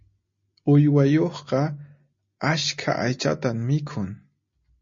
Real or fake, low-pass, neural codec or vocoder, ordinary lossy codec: real; 7.2 kHz; none; MP3, 32 kbps